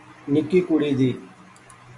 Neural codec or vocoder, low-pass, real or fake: none; 10.8 kHz; real